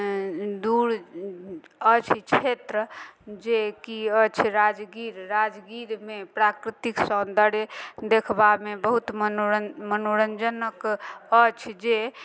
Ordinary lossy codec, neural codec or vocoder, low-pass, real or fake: none; none; none; real